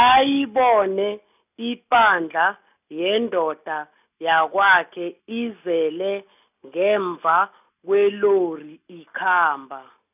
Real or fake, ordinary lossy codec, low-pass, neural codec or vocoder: real; none; 3.6 kHz; none